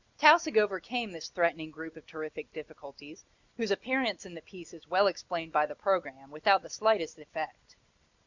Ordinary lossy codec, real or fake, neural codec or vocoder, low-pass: Opus, 64 kbps; real; none; 7.2 kHz